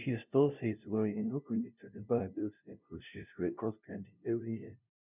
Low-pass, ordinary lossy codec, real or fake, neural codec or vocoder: 3.6 kHz; none; fake; codec, 16 kHz, 0.5 kbps, FunCodec, trained on LibriTTS, 25 frames a second